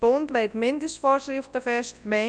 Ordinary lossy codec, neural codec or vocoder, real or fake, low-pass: none; codec, 24 kHz, 0.9 kbps, WavTokenizer, large speech release; fake; 9.9 kHz